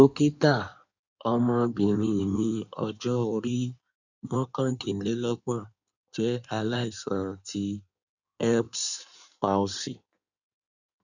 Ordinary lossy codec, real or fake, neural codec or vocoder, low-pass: none; fake; codec, 16 kHz in and 24 kHz out, 1.1 kbps, FireRedTTS-2 codec; 7.2 kHz